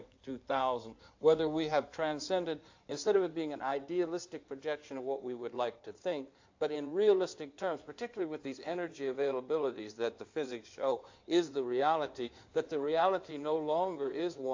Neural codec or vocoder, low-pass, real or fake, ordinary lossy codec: codec, 16 kHz in and 24 kHz out, 2.2 kbps, FireRedTTS-2 codec; 7.2 kHz; fake; AAC, 48 kbps